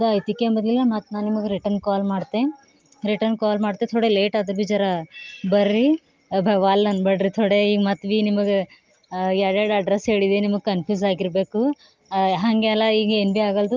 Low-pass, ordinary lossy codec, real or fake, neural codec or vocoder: 7.2 kHz; Opus, 32 kbps; real; none